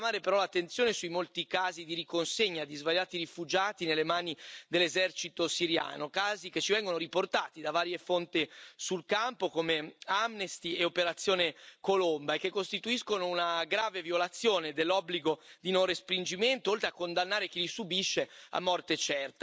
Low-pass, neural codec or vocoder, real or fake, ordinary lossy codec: none; none; real; none